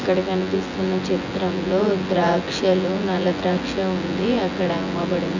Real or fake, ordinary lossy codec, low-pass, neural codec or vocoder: fake; none; 7.2 kHz; vocoder, 24 kHz, 100 mel bands, Vocos